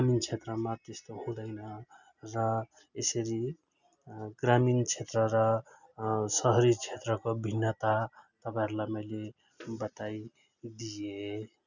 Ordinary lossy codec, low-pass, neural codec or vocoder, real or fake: none; 7.2 kHz; none; real